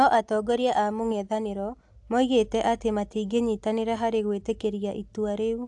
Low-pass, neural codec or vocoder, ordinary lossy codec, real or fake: 10.8 kHz; none; AAC, 64 kbps; real